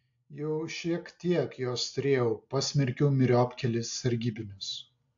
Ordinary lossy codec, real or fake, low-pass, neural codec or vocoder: AAC, 64 kbps; real; 7.2 kHz; none